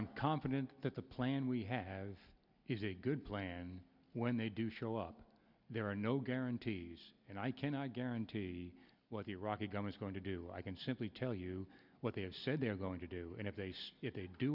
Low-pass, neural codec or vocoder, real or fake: 5.4 kHz; none; real